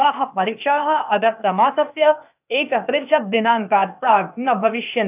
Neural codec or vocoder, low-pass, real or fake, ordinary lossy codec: codec, 16 kHz, 0.8 kbps, ZipCodec; 3.6 kHz; fake; none